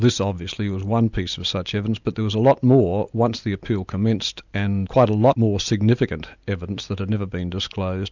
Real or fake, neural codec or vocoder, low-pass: real; none; 7.2 kHz